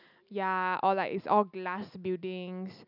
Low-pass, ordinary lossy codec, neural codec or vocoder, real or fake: 5.4 kHz; none; none; real